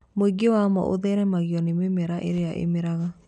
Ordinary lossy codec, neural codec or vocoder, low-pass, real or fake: none; none; 10.8 kHz; real